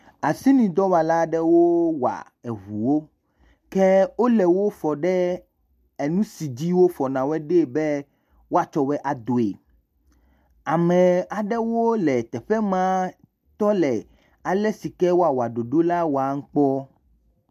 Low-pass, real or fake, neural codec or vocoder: 14.4 kHz; real; none